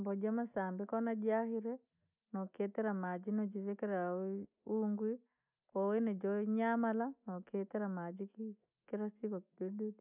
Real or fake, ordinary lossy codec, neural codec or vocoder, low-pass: real; none; none; 3.6 kHz